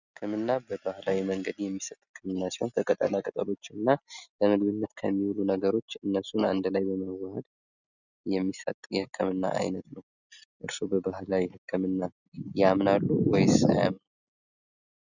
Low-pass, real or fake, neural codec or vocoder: 7.2 kHz; real; none